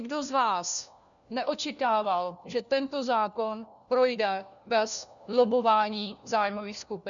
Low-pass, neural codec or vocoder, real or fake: 7.2 kHz; codec, 16 kHz, 1 kbps, FunCodec, trained on LibriTTS, 50 frames a second; fake